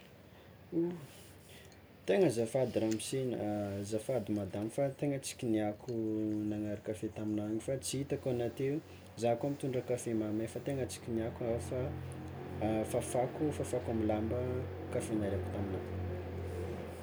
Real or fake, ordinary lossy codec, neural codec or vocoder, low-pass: real; none; none; none